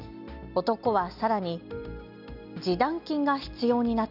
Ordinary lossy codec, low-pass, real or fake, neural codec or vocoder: none; 5.4 kHz; real; none